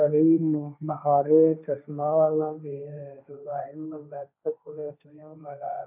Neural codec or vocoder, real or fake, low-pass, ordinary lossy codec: codec, 16 kHz, 1.1 kbps, Voila-Tokenizer; fake; 3.6 kHz; none